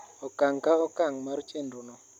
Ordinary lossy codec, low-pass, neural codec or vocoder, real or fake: none; 19.8 kHz; none; real